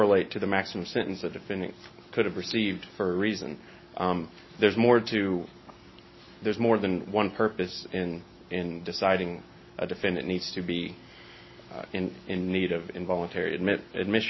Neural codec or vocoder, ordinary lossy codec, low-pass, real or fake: none; MP3, 24 kbps; 7.2 kHz; real